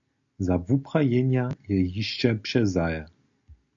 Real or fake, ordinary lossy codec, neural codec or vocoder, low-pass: real; AAC, 64 kbps; none; 7.2 kHz